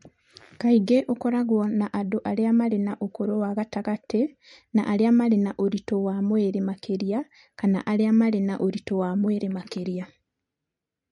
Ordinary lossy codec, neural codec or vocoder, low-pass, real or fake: MP3, 48 kbps; none; 19.8 kHz; real